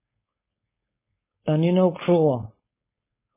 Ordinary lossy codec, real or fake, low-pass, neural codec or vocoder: MP3, 16 kbps; fake; 3.6 kHz; codec, 16 kHz, 4.8 kbps, FACodec